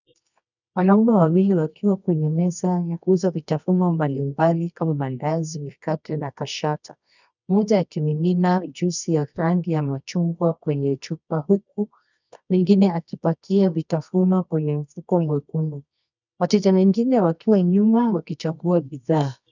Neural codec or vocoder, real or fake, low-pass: codec, 24 kHz, 0.9 kbps, WavTokenizer, medium music audio release; fake; 7.2 kHz